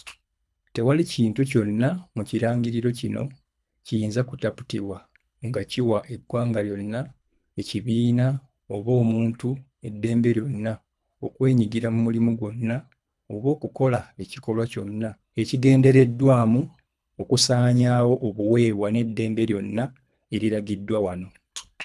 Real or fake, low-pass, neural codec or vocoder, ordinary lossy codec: fake; none; codec, 24 kHz, 3 kbps, HILCodec; none